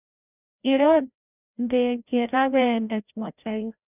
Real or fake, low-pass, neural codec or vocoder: fake; 3.6 kHz; codec, 16 kHz, 0.5 kbps, FreqCodec, larger model